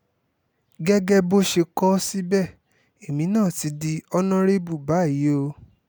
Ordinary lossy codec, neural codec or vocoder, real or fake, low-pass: none; none; real; none